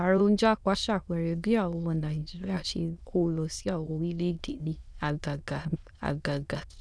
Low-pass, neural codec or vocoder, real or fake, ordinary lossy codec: none; autoencoder, 22.05 kHz, a latent of 192 numbers a frame, VITS, trained on many speakers; fake; none